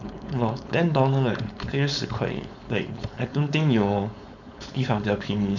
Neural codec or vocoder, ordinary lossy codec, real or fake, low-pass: codec, 16 kHz, 4.8 kbps, FACodec; none; fake; 7.2 kHz